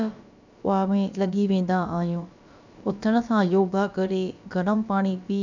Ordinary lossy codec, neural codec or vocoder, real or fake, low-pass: none; codec, 16 kHz, about 1 kbps, DyCAST, with the encoder's durations; fake; 7.2 kHz